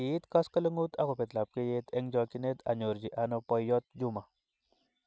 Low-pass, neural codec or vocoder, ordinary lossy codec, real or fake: none; none; none; real